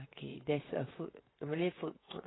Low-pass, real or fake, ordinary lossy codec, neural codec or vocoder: 7.2 kHz; fake; AAC, 16 kbps; vocoder, 44.1 kHz, 128 mel bands, Pupu-Vocoder